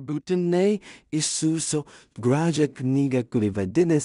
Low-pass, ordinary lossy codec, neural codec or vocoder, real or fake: 10.8 kHz; MP3, 96 kbps; codec, 16 kHz in and 24 kHz out, 0.4 kbps, LongCat-Audio-Codec, two codebook decoder; fake